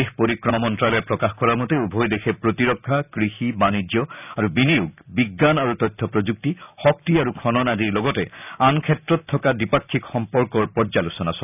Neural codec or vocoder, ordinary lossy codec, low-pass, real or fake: none; none; 3.6 kHz; real